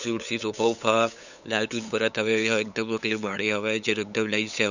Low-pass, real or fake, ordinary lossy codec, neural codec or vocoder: 7.2 kHz; fake; none; codec, 16 kHz, 8 kbps, FunCodec, trained on LibriTTS, 25 frames a second